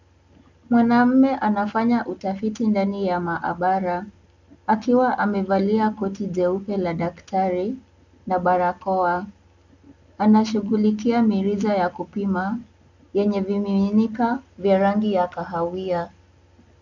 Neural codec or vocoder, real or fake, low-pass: none; real; 7.2 kHz